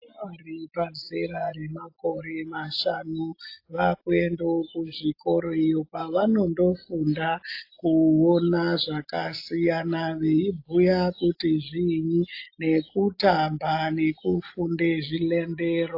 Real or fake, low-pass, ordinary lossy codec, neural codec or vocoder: real; 5.4 kHz; AAC, 32 kbps; none